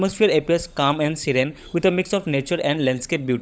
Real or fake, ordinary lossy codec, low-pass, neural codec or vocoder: fake; none; none; codec, 16 kHz, 16 kbps, FunCodec, trained on LibriTTS, 50 frames a second